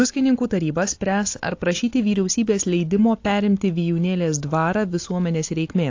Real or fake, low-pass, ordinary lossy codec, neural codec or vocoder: real; 7.2 kHz; AAC, 48 kbps; none